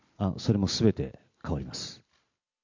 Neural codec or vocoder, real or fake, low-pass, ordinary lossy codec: none; real; 7.2 kHz; MP3, 64 kbps